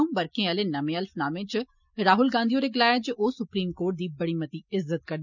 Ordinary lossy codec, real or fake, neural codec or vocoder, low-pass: none; real; none; 7.2 kHz